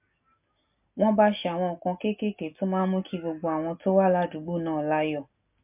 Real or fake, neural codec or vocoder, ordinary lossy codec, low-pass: real; none; MP3, 32 kbps; 3.6 kHz